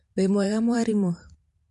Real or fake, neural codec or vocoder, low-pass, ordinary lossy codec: fake; vocoder, 44.1 kHz, 128 mel bands every 512 samples, BigVGAN v2; 14.4 kHz; MP3, 48 kbps